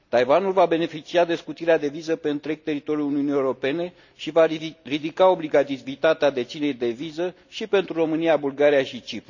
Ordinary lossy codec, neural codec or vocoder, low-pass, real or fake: none; none; 7.2 kHz; real